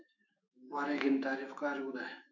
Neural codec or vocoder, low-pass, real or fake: autoencoder, 48 kHz, 128 numbers a frame, DAC-VAE, trained on Japanese speech; 7.2 kHz; fake